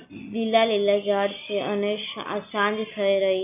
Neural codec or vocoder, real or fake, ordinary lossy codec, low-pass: none; real; none; 3.6 kHz